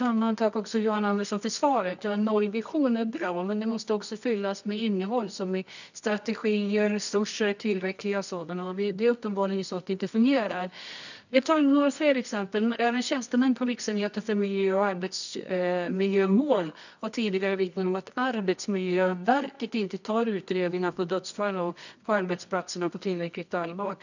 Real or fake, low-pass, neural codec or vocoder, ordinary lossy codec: fake; 7.2 kHz; codec, 24 kHz, 0.9 kbps, WavTokenizer, medium music audio release; none